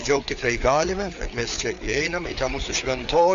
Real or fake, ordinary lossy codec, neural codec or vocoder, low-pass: fake; AAC, 64 kbps; codec, 16 kHz, 4 kbps, FreqCodec, larger model; 7.2 kHz